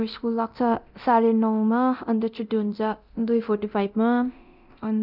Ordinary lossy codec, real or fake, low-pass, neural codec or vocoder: none; fake; 5.4 kHz; codec, 24 kHz, 0.9 kbps, DualCodec